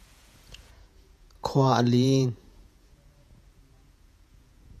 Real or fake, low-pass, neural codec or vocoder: fake; 14.4 kHz; vocoder, 48 kHz, 128 mel bands, Vocos